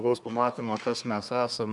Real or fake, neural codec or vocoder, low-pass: fake; codec, 24 kHz, 1 kbps, SNAC; 10.8 kHz